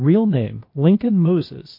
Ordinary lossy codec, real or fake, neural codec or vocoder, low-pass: MP3, 32 kbps; fake; codec, 16 kHz, 0.8 kbps, ZipCodec; 5.4 kHz